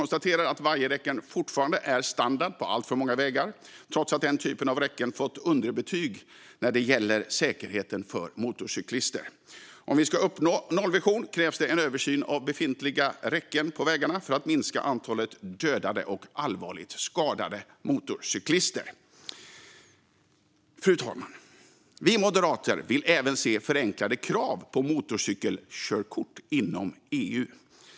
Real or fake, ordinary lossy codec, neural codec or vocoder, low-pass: real; none; none; none